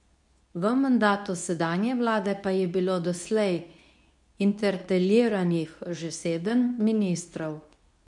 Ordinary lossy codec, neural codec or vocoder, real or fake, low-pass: none; codec, 24 kHz, 0.9 kbps, WavTokenizer, medium speech release version 2; fake; 10.8 kHz